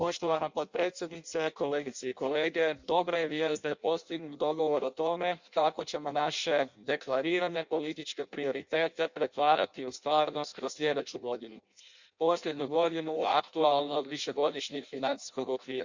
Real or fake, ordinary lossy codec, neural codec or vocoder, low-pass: fake; Opus, 64 kbps; codec, 16 kHz in and 24 kHz out, 0.6 kbps, FireRedTTS-2 codec; 7.2 kHz